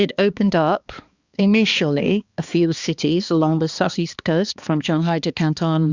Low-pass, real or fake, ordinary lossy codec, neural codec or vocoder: 7.2 kHz; fake; Opus, 64 kbps; codec, 16 kHz, 2 kbps, X-Codec, HuBERT features, trained on balanced general audio